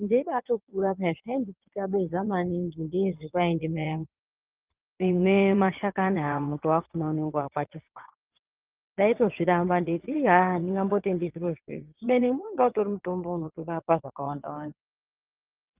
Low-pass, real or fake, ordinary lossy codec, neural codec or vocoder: 3.6 kHz; fake; Opus, 24 kbps; vocoder, 22.05 kHz, 80 mel bands, WaveNeXt